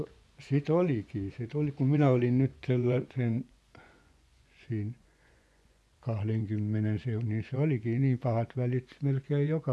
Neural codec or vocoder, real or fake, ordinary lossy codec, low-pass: vocoder, 24 kHz, 100 mel bands, Vocos; fake; none; none